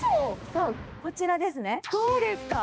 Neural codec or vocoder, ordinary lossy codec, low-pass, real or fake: codec, 16 kHz, 2 kbps, X-Codec, HuBERT features, trained on balanced general audio; none; none; fake